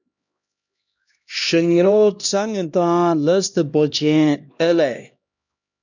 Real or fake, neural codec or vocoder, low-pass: fake; codec, 16 kHz, 1 kbps, X-Codec, HuBERT features, trained on LibriSpeech; 7.2 kHz